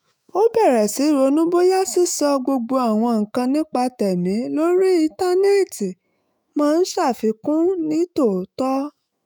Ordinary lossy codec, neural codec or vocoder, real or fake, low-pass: none; autoencoder, 48 kHz, 128 numbers a frame, DAC-VAE, trained on Japanese speech; fake; none